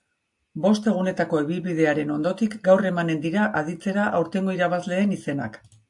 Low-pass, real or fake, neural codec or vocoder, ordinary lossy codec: 10.8 kHz; real; none; AAC, 64 kbps